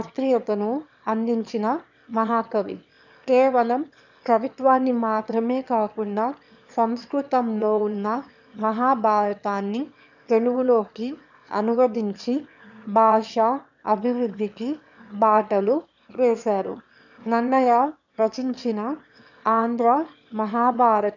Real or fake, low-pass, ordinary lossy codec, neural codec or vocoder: fake; 7.2 kHz; none; autoencoder, 22.05 kHz, a latent of 192 numbers a frame, VITS, trained on one speaker